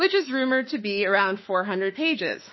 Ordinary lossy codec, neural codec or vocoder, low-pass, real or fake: MP3, 24 kbps; autoencoder, 48 kHz, 32 numbers a frame, DAC-VAE, trained on Japanese speech; 7.2 kHz; fake